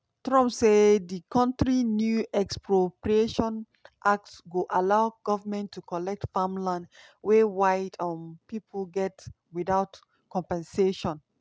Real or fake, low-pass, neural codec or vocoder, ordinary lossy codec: real; none; none; none